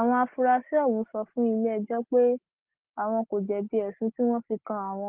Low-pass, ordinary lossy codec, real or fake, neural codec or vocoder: 3.6 kHz; Opus, 16 kbps; real; none